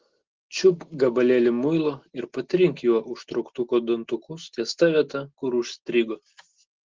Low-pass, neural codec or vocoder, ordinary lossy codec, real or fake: 7.2 kHz; none; Opus, 16 kbps; real